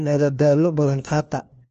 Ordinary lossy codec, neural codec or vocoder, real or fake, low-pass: Opus, 24 kbps; codec, 16 kHz, 1 kbps, FunCodec, trained on LibriTTS, 50 frames a second; fake; 7.2 kHz